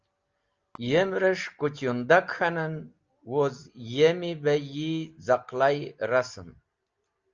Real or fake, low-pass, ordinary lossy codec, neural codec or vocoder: real; 7.2 kHz; Opus, 24 kbps; none